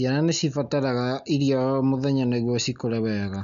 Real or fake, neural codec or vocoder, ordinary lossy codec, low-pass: real; none; MP3, 96 kbps; 7.2 kHz